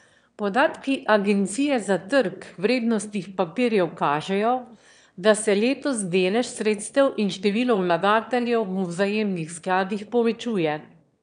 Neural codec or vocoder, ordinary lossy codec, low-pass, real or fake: autoencoder, 22.05 kHz, a latent of 192 numbers a frame, VITS, trained on one speaker; none; 9.9 kHz; fake